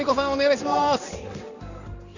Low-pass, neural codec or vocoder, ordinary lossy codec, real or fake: 7.2 kHz; codec, 16 kHz in and 24 kHz out, 1 kbps, XY-Tokenizer; none; fake